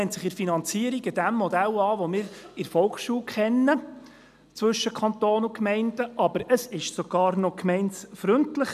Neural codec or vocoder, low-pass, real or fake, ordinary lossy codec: none; 14.4 kHz; real; none